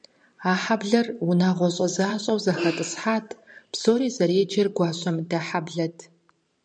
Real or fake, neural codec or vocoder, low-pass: fake; vocoder, 44.1 kHz, 128 mel bands every 512 samples, BigVGAN v2; 9.9 kHz